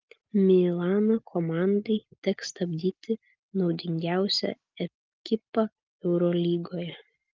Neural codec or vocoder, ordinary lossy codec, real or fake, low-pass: none; Opus, 32 kbps; real; 7.2 kHz